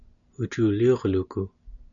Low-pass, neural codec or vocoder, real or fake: 7.2 kHz; none; real